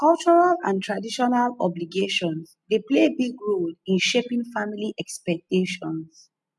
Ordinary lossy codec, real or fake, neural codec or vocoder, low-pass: none; real; none; none